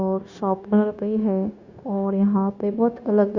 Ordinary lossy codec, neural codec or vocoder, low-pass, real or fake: Opus, 64 kbps; codec, 16 kHz, 0.9 kbps, LongCat-Audio-Codec; 7.2 kHz; fake